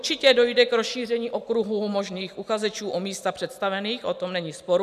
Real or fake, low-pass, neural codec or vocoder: real; 14.4 kHz; none